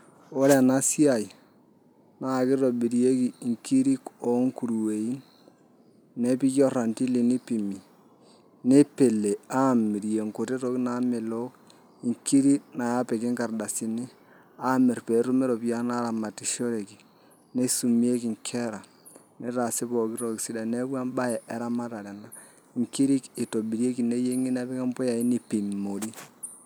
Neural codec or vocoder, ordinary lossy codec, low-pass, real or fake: none; none; none; real